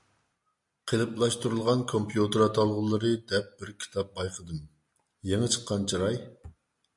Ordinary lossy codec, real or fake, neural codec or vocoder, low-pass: MP3, 64 kbps; real; none; 10.8 kHz